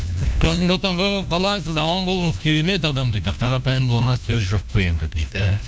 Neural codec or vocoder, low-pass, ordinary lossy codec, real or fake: codec, 16 kHz, 1 kbps, FunCodec, trained on LibriTTS, 50 frames a second; none; none; fake